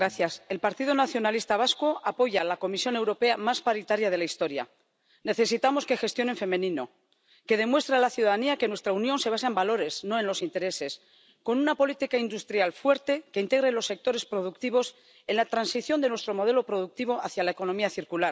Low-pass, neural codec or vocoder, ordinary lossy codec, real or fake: none; none; none; real